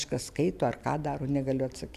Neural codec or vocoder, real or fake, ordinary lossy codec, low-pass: none; real; AAC, 96 kbps; 14.4 kHz